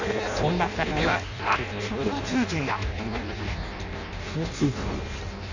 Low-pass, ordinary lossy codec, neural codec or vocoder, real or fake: 7.2 kHz; none; codec, 16 kHz in and 24 kHz out, 0.6 kbps, FireRedTTS-2 codec; fake